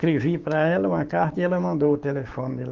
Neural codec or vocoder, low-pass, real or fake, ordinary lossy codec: codec, 16 kHz, 6 kbps, DAC; 7.2 kHz; fake; Opus, 16 kbps